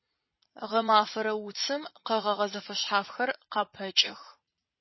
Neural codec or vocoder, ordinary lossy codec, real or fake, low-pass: vocoder, 44.1 kHz, 80 mel bands, Vocos; MP3, 24 kbps; fake; 7.2 kHz